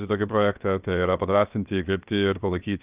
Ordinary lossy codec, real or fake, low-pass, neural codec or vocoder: Opus, 24 kbps; fake; 3.6 kHz; codec, 16 kHz, 0.7 kbps, FocalCodec